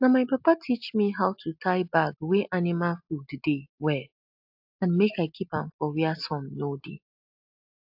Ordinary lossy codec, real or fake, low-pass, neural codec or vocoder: AAC, 48 kbps; real; 5.4 kHz; none